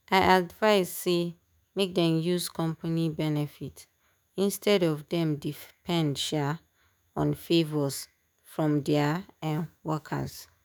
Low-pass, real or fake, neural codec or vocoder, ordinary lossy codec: none; fake; autoencoder, 48 kHz, 128 numbers a frame, DAC-VAE, trained on Japanese speech; none